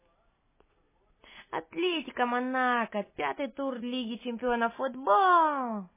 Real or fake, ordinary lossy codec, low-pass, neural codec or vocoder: real; MP3, 16 kbps; 3.6 kHz; none